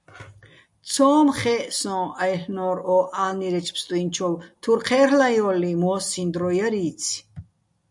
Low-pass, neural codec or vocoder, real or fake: 10.8 kHz; none; real